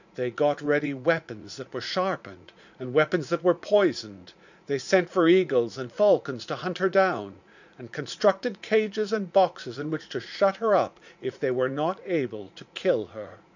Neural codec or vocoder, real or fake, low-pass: vocoder, 44.1 kHz, 80 mel bands, Vocos; fake; 7.2 kHz